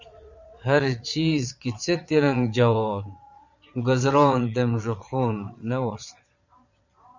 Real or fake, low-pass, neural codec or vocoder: fake; 7.2 kHz; vocoder, 22.05 kHz, 80 mel bands, Vocos